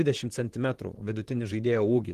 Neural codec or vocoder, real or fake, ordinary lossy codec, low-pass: vocoder, 44.1 kHz, 128 mel bands, Pupu-Vocoder; fake; Opus, 16 kbps; 14.4 kHz